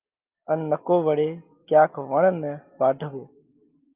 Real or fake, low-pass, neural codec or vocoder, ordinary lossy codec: real; 3.6 kHz; none; Opus, 24 kbps